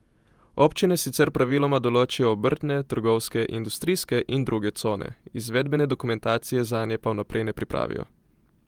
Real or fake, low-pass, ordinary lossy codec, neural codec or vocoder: fake; 19.8 kHz; Opus, 32 kbps; vocoder, 48 kHz, 128 mel bands, Vocos